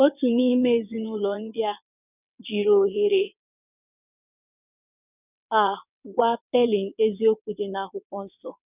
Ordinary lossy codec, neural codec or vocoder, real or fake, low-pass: none; vocoder, 22.05 kHz, 80 mel bands, WaveNeXt; fake; 3.6 kHz